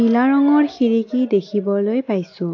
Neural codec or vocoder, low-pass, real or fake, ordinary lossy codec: none; 7.2 kHz; real; AAC, 48 kbps